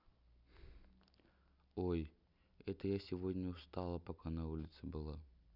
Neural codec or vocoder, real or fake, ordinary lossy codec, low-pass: none; real; none; 5.4 kHz